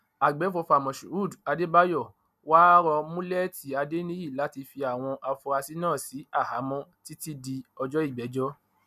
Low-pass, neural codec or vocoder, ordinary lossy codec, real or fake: 14.4 kHz; none; none; real